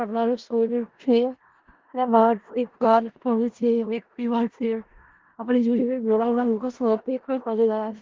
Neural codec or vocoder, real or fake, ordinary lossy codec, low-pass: codec, 16 kHz in and 24 kHz out, 0.4 kbps, LongCat-Audio-Codec, four codebook decoder; fake; Opus, 16 kbps; 7.2 kHz